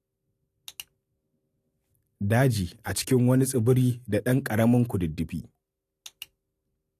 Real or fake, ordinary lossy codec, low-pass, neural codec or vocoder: real; AAC, 64 kbps; 14.4 kHz; none